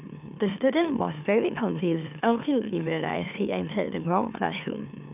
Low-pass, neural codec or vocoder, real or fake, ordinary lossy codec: 3.6 kHz; autoencoder, 44.1 kHz, a latent of 192 numbers a frame, MeloTTS; fake; none